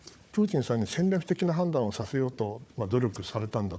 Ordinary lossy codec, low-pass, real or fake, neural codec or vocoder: none; none; fake; codec, 16 kHz, 4 kbps, FunCodec, trained on Chinese and English, 50 frames a second